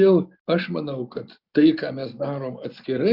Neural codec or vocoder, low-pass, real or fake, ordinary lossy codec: none; 5.4 kHz; real; Opus, 64 kbps